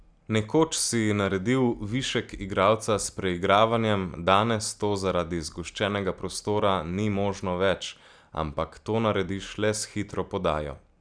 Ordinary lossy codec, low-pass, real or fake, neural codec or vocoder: none; 9.9 kHz; real; none